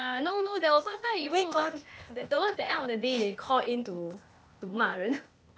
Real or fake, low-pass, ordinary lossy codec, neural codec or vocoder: fake; none; none; codec, 16 kHz, 0.8 kbps, ZipCodec